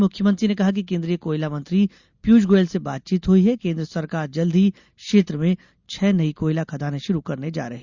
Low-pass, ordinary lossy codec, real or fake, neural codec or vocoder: 7.2 kHz; Opus, 64 kbps; real; none